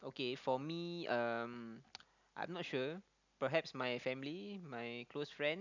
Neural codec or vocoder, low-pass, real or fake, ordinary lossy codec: none; 7.2 kHz; real; none